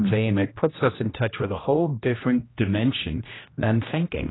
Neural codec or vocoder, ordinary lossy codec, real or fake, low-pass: codec, 16 kHz, 1 kbps, X-Codec, HuBERT features, trained on general audio; AAC, 16 kbps; fake; 7.2 kHz